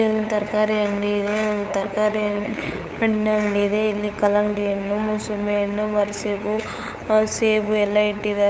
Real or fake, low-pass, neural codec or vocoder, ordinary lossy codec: fake; none; codec, 16 kHz, 8 kbps, FunCodec, trained on LibriTTS, 25 frames a second; none